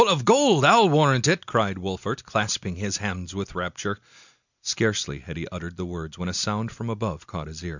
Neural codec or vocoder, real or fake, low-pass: none; real; 7.2 kHz